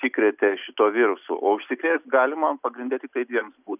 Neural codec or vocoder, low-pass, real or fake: none; 3.6 kHz; real